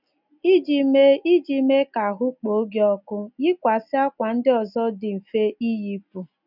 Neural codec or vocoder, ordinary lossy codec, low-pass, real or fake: none; none; 5.4 kHz; real